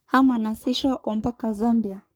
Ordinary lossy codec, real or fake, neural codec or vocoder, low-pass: none; fake; codec, 44.1 kHz, 3.4 kbps, Pupu-Codec; none